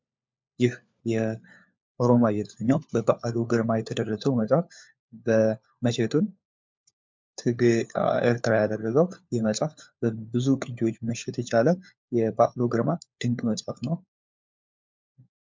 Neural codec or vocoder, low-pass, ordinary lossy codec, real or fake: codec, 16 kHz, 4 kbps, FunCodec, trained on LibriTTS, 50 frames a second; 7.2 kHz; MP3, 64 kbps; fake